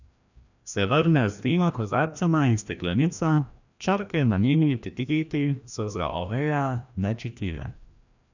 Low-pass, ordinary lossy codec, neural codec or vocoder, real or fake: 7.2 kHz; none; codec, 16 kHz, 1 kbps, FreqCodec, larger model; fake